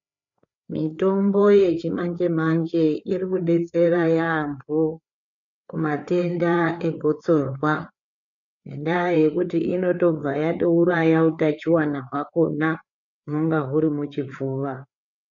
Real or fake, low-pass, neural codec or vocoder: fake; 7.2 kHz; codec, 16 kHz, 4 kbps, FreqCodec, larger model